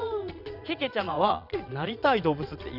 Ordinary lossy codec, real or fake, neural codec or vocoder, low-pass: none; fake; vocoder, 22.05 kHz, 80 mel bands, Vocos; 5.4 kHz